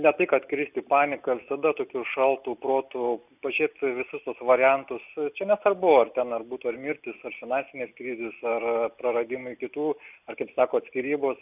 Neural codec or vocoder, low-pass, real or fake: none; 3.6 kHz; real